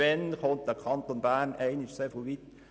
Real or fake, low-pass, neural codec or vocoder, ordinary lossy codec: real; none; none; none